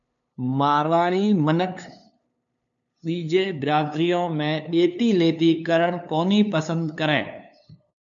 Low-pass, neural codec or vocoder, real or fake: 7.2 kHz; codec, 16 kHz, 2 kbps, FunCodec, trained on LibriTTS, 25 frames a second; fake